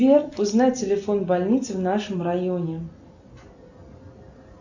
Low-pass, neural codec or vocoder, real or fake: 7.2 kHz; none; real